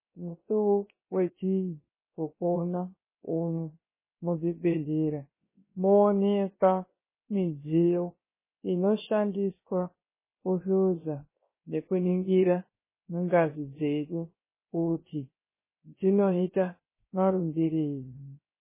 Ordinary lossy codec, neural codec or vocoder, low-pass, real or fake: MP3, 16 kbps; codec, 16 kHz, 0.3 kbps, FocalCodec; 3.6 kHz; fake